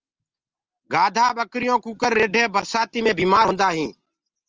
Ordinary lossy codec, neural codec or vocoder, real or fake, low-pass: Opus, 24 kbps; none; real; 7.2 kHz